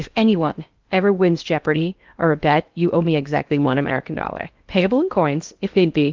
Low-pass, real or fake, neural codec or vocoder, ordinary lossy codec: 7.2 kHz; fake; codec, 16 kHz in and 24 kHz out, 0.6 kbps, FocalCodec, streaming, 4096 codes; Opus, 32 kbps